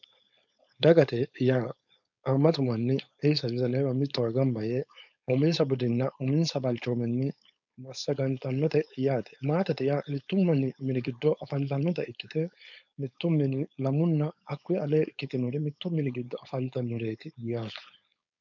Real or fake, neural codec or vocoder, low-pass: fake; codec, 16 kHz, 4.8 kbps, FACodec; 7.2 kHz